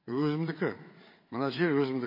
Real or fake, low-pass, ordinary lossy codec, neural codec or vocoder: fake; 5.4 kHz; MP3, 24 kbps; codec, 16 kHz, 8 kbps, FreqCodec, larger model